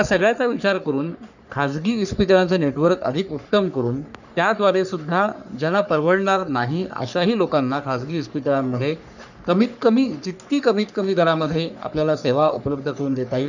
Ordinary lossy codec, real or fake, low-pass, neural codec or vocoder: none; fake; 7.2 kHz; codec, 44.1 kHz, 3.4 kbps, Pupu-Codec